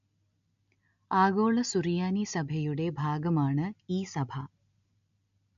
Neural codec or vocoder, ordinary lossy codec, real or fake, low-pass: none; MP3, 64 kbps; real; 7.2 kHz